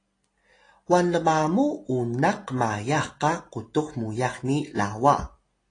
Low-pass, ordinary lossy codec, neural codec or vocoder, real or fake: 9.9 kHz; AAC, 32 kbps; none; real